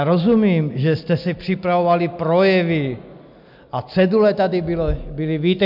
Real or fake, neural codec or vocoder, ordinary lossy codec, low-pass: real; none; MP3, 48 kbps; 5.4 kHz